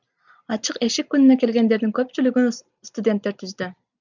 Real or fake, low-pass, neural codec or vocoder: real; 7.2 kHz; none